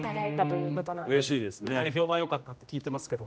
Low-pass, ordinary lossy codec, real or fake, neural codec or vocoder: none; none; fake; codec, 16 kHz, 1 kbps, X-Codec, HuBERT features, trained on general audio